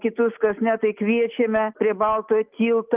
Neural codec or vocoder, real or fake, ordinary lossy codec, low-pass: none; real; Opus, 24 kbps; 3.6 kHz